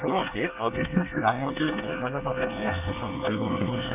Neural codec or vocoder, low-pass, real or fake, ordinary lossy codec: codec, 24 kHz, 1 kbps, SNAC; 3.6 kHz; fake; none